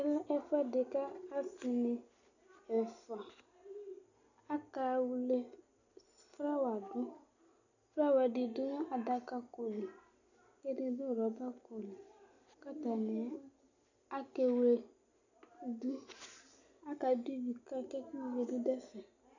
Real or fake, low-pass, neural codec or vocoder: real; 7.2 kHz; none